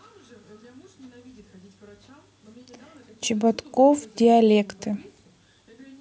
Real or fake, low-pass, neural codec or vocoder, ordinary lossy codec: real; none; none; none